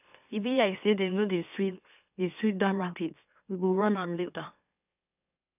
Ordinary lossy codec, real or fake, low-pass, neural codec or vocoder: none; fake; 3.6 kHz; autoencoder, 44.1 kHz, a latent of 192 numbers a frame, MeloTTS